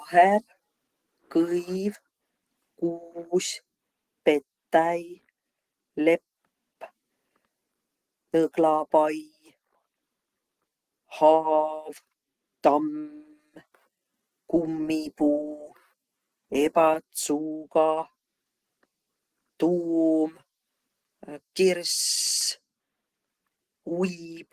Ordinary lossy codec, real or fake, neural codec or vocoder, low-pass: Opus, 16 kbps; real; none; 14.4 kHz